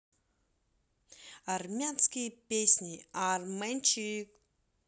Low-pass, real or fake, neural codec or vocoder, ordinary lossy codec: none; real; none; none